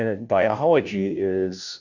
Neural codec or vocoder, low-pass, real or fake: codec, 16 kHz, 0.5 kbps, FunCodec, trained on Chinese and English, 25 frames a second; 7.2 kHz; fake